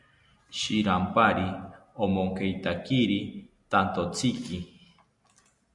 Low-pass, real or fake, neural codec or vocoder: 10.8 kHz; real; none